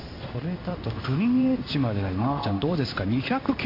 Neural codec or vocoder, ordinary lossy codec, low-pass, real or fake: codec, 16 kHz in and 24 kHz out, 1 kbps, XY-Tokenizer; MP3, 32 kbps; 5.4 kHz; fake